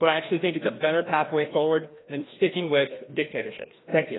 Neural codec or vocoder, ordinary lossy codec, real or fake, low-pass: codec, 16 kHz, 1 kbps, FreqCodec, larger model; AAC, 16 kbps; fake; 7.2 kHz